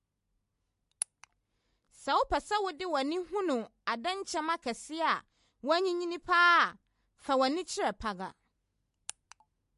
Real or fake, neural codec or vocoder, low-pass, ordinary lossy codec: real; none; 14.4 kHz; MP3, 48 kbps